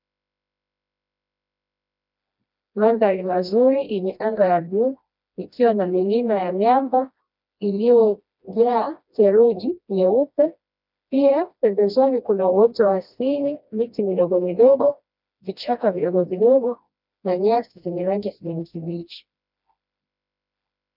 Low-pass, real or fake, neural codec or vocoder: 5.4 kHz; fake; codec, 16 kHz, 1 kbps, FreqCodec, smaller model